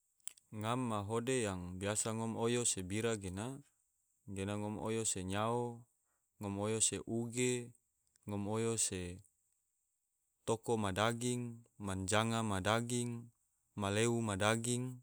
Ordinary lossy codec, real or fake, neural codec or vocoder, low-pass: none; real; none; none